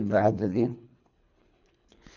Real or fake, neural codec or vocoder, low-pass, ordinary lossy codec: fake; codec, 24 kHz, 3 kbps, HILCodec; 7.2 kHz; none